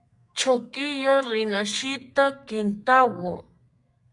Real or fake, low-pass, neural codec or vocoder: fake; 10.8 kHz; codec, 32 kHz, 1.9 kbps, SNAC